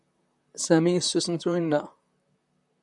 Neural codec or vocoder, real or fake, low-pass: vocoder, 44.1 kHz, 128 mel bands, Pupu-Vocoder; fake; 10.8 kHz